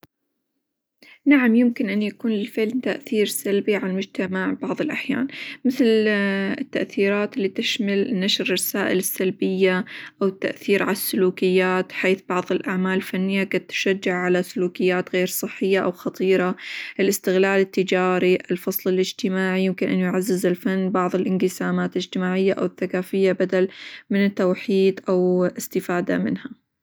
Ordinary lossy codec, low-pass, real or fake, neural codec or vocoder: none; none; real; none